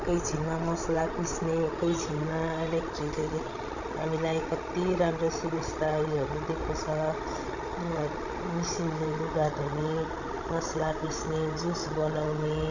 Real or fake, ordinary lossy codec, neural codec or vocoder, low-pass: fake; none; codec, 16 kHz, 16 kbps, FreqCodec, larger model; 7.2 kHz